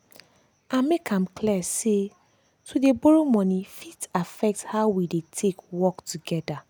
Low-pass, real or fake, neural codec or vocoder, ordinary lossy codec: none; real; none; none